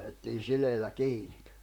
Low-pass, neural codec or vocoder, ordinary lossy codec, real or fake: 19.8 kHz; vocoder, 44.1 kHz, 128 mel bands, Pupu-Vocoder; none; fake